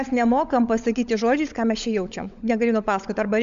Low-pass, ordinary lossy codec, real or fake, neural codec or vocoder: 7.2 kHz; MP3, 64 kbps; fake; codec, 16 kHz, 16 kbps, FunCodec, trained on LibriTTS, 50 frames a second